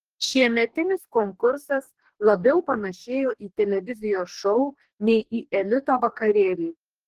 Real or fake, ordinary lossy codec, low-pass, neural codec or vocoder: fake; Opus, 16 kbps; 14.4 kHz; codec, 44.1 kHz, 2.6 kbps, DAC